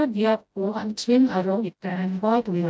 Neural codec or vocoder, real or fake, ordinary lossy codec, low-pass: codec, 16 kHz, 0.5 kbps, FreqCodec, smaller model; fake; none; none